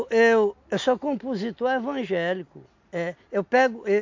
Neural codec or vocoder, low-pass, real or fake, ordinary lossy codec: none; 7.2 kHz; real; MP3, 48 kbps